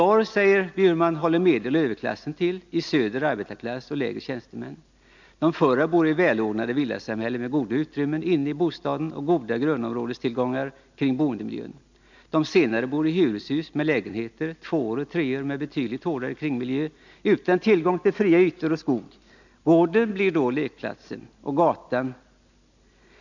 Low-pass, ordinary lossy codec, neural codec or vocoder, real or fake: 7.2 kHz; none; none; real